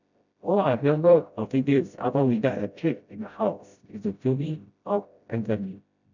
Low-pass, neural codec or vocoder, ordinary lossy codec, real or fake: 7.2 kHz; codec, 16 kHz, 0.5 kbps, FreqCodec, smaller model; none; fake